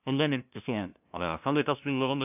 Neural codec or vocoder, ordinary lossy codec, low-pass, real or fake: codec, 16 kHz, 0.5 kbps, FunCodec, trained on LibriTTS, 25 frames a second; none; 3.6 kHz; fake